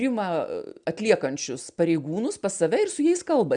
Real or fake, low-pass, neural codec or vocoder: real; 9.9 kHz; none